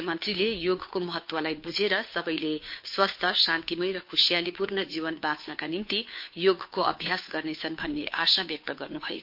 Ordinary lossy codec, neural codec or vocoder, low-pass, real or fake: MP3, 32 kbps; codec, 16 kHz, 2 kbps, FunCodec, trained on Chinese and English, 25 frames a second; 5.4 kHz; fake